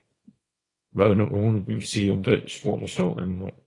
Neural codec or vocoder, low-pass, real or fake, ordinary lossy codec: codec, 24 kHz, 0.9 kbps, WavTokenizer, small release; 10.8 kHz; fake; AAC, 32 kbps